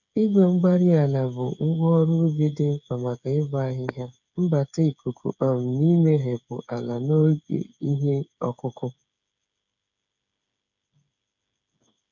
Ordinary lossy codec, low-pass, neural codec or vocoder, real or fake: none; 7.2 kHz; codec, 16 kHz, 8 kbps, FreqCodec, smaller model; fake